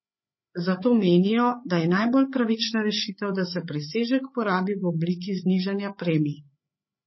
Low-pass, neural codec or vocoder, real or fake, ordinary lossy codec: 7.2 kHz; codec, 16 kHz, 8 kbps, FreqCodec, larger model; fake; MP3, 24 kbps